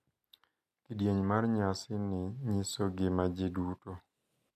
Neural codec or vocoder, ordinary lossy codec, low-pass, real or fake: none; MP3, 96 kbps; 14.4 kHz; real